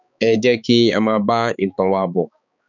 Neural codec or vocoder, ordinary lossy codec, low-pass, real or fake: codec, 16 kHz, 4 kbps, X-Codec, HuBERT features, trained on balanced general audio; none; 7.2 kHz; fake